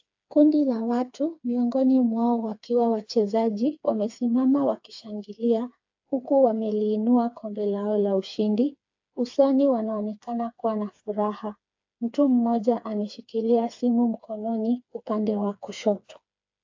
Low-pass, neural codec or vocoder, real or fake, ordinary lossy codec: 7.2 kHz; codec, 16 kHz, 4 kbps, FreqCodec, smaller model; fake; AAC, 48 kbps